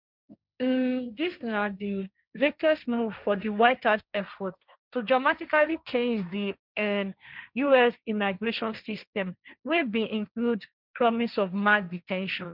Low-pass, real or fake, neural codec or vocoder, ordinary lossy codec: 5.4 kHz; fake; codec, 16 kHz, 1.1 kbps, Voila-Tokenizer; none